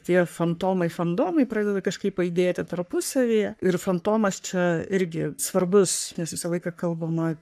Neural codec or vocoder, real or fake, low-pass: codec, 44.1 kHz, 3.4 kbps, Pupu-Codec; fake; 14.4 kHz